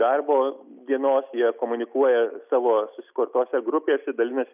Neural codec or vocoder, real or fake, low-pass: none; real; 3.6 kHz